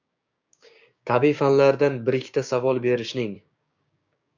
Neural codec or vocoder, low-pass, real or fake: codec, 16 kHz, 6 kbps, DAC; 7.2 kHz; fake